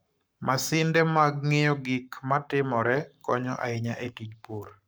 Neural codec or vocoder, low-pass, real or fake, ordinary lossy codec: codec, 44.1 kHz, 7.8 kbps, Pupu-Codec; none; fake; none